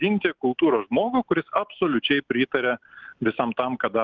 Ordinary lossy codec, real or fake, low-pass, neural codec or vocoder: Opus, 32 kbps; real; 7.2 kHz; none